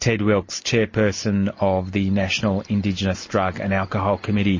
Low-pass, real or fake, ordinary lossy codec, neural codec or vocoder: 7.2 kHz; real; MP3, 32 kbps; none